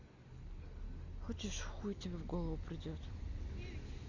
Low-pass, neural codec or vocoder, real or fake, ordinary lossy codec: 7.2 kHz; none; real; none